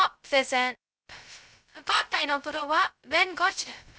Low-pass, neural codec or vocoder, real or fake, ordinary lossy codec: none; codec, 16 kHz, 0.2 kbps, FocalCodec; fake; none